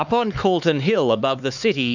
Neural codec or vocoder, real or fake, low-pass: codec, 16 kHz, 4 kbps, X-Codec, HuBERT features, trained on LibriSpeech; fake; 7.2 kHz